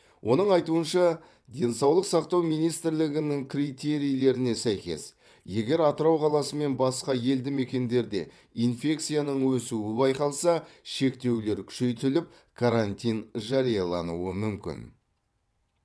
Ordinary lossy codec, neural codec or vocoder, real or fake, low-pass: none; vocoder, 22.05 kHz, 80 mel bands, WaveNeXt; fake; none